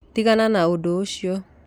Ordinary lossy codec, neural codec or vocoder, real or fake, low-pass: none; none; real; 19.8 kHz